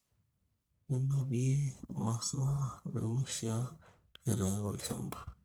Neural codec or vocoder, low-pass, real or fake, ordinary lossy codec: codec, 44.1 kHz, 1.7 kbps, Pupu-Codec; none; fake; none